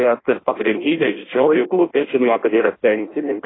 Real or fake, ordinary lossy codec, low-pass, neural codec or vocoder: fake; AAC, 16 kbps; 7.2 kHz; codec, 16 kHz in and 24 kHz out, 0.6 kbps, FireRedTTS-2 codec